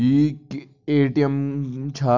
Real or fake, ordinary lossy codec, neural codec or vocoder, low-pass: real; none; none; 7.2 kHz